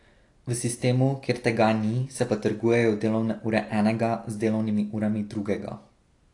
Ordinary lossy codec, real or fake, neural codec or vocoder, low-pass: AAC, 48 kbps; real; none; 10.8 kHz